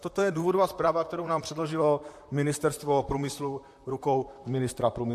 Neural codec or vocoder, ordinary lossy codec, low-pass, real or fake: vocoder, 44.1 kHz, 128 mel bands, Pupu-Vocoder; MP3, 64 kbps; 14.4 kHz; fake